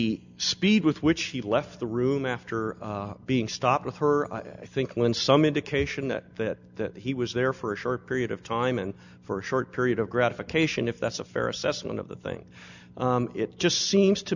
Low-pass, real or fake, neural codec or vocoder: 7.2 kHz; real; none